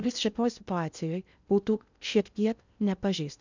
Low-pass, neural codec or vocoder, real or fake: 7.2 kHz; codec, 16 kHz in and 24 kHz out, 0.6 kbps, FocalCodec, streaming, 2048 codes; fake